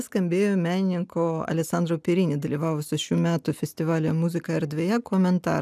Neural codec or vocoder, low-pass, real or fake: none; 14.4 kHz; real